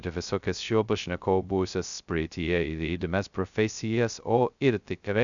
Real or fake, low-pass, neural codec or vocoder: fake; 7.2 kHz; codec, 16 kHz, 0.2 kbps, FocalCodec